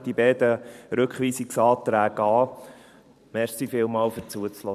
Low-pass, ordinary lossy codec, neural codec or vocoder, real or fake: 14.4 kHz; none; vocoder, 44.1 kHz, 128 mel bands every 512 samples, BigVGAN v2; fake